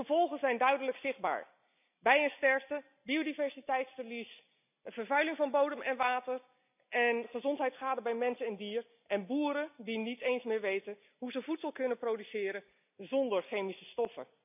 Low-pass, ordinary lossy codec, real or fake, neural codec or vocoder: 3.6 kHz; none; real; none